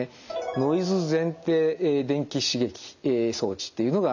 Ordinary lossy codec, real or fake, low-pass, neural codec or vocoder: none; real; 7.2 kHz; none